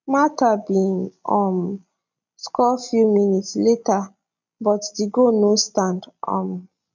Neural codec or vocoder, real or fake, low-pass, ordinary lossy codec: none; real; 7.2 kHz; none